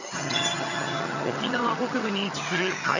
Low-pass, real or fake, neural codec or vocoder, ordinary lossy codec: 7.2 kHz; fake; vocoder, 22.05 kHz, 80 mel bands, HiFi-GAN; none